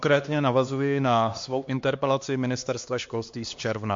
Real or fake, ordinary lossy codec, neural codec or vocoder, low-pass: fake; MP3, 48 kbps; codec, 16 kHz, 2 kbps, X-Codec, HuBERT features, trained on LibriSpeech; 7.2 kHz